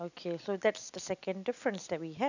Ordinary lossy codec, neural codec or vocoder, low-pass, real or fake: none; none; 7.2 kHz; real